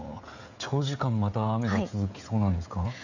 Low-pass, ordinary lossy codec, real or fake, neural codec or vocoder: 7.2 kHz; none; fake; codec, 16 kHz, 16 kbps, FreqCodec, smaller model